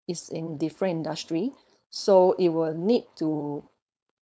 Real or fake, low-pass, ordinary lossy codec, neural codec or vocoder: fake; none; none; codec, 16 kHz, 4.8 kbps, FACodec